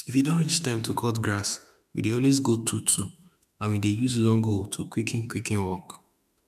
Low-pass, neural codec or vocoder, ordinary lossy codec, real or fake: 14.4 kHz; autoencoder, 48 kHz, 32 numbers a frame, DAC-VAE, trained on Japanese speech; none; fake